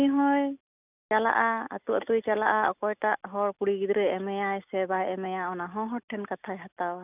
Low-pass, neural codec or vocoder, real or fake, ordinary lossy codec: 3.6 kHz; none; real; none